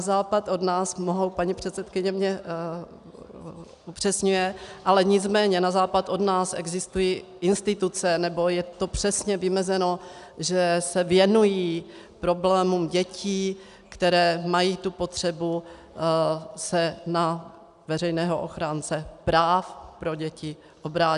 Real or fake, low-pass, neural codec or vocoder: real; 10.8 kHz; none